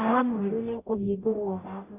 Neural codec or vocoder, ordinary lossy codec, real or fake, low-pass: codec, 44.1 kHz, 0.9 kbps, DAC; none; fake; 3.6 kHz